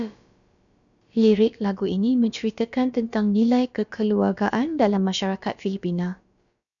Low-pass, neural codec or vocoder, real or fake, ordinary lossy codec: 7.2 kHz; codec, 16 kHz, about 1 kbps, DyCAST, with the encoder's durations; fake; MP3, 96 kbps